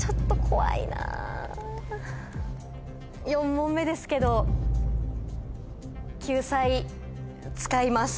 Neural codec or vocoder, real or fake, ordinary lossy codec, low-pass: none; real; none; none